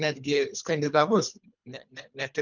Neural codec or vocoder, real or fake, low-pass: codec, 24 kHz, 3 kbps, HILCodec; fake; 7.2 kHz